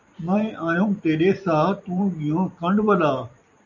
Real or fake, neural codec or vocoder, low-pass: fake; vocoder, 44.1 kHz, 128 mel bands every 256 samples, BigVGAN v2; 7.2 kHz